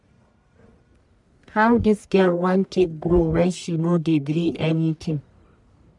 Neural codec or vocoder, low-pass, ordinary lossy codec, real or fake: codec, 44.1 kHz, 1.7 kbps, Pupu-Codec; 10.8 kHz; MP3, 96 kbps; fake